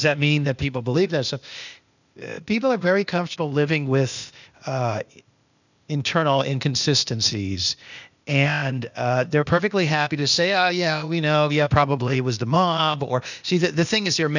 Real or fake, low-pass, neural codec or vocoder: fake; 7.2 kHz; codec, 16 kHz, 0.8 kbps, ZipCodec